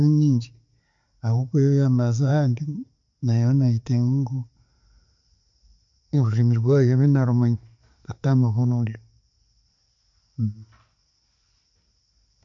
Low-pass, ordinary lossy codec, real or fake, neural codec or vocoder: 7.2 kHz; MP3, 48 kbps; real; none